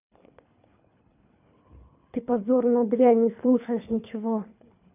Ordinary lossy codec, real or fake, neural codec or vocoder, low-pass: none; fake; codec, 24 kHz, 3 kbps, HILCodec; 3.6 kHz